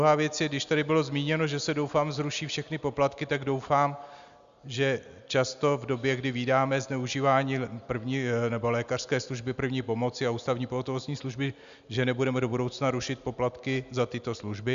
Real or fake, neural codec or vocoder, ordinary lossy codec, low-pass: real; none; Opus, 64 kbps; 7.2 kHz